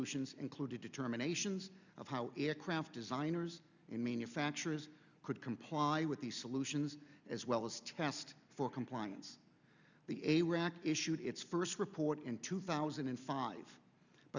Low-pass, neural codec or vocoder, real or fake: 7.2 kHz; none; real